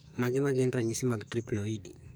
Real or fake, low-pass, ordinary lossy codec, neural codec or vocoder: fake; none; none; codec, 44.1 kHz, 2.6 kbps, SNAC